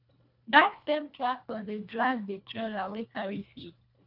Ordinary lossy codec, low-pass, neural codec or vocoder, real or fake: AAC, 48 kbps; 5.4 kHz; codec, 24 kHz, 1.5 kbps, HILCodec; fake